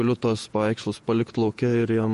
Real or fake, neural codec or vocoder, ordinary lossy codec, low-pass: fake; vocoder, 48 kHz, 128 mel bands, Vocos; MP3, 48 kbps; 14.4 kHz